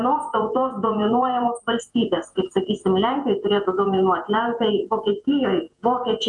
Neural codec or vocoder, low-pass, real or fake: vocoder, 48 kHz, 128 mel bands, Vocos; 10.8 kHz; fake